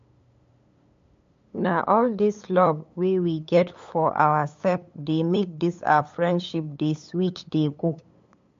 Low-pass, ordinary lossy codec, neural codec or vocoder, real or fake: 7.2 kHz; MP3, 48 kbps; codec, 16 kHz, 8 kbps, FunCodec, trained on LibriTTS, 25 frames a second; fake